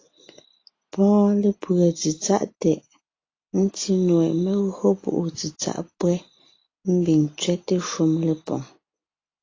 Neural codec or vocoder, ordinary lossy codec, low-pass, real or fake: none; AAC, 32 kbps; 7.2 kHz; real